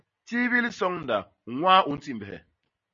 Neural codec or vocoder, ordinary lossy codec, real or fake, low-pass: none; MP3, 32 kbps; real; 7.2 kHz